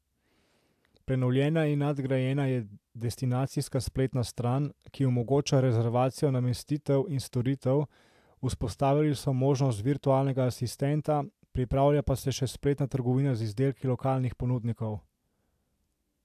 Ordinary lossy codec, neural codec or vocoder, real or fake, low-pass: AAC, 96 kbps; none; real; 14.4 kHz